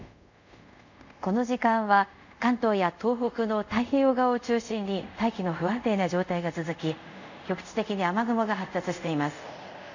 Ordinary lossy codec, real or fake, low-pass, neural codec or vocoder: none; fake; 7.2 kHz; codec, 24 kHz, 0.5 kbps, DualCodec